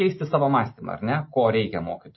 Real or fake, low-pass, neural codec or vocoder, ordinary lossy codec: real; 7.2 kHz; none; MP3, 24 kbps